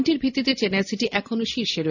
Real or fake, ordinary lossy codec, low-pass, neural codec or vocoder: real; none; 7.2 kHz; none